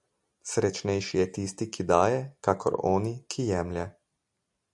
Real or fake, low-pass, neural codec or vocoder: real; 10.8 kHz; none